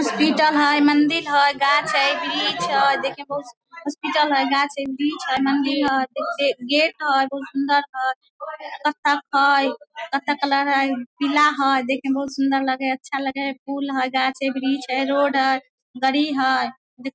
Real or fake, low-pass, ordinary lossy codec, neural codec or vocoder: real; none; none; none